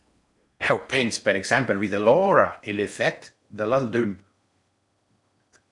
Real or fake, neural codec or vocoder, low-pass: fake; codec, 16 kHz in and 24 kHz out, 0.6 kbps, FocalCodec, streaming, 4096 codes; 10.8 kHz